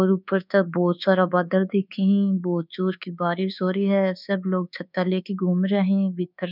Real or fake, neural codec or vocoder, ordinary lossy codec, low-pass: fake; codec, 24 kHz, 1.2 kbps, DualCodec; none; 5.4 kHz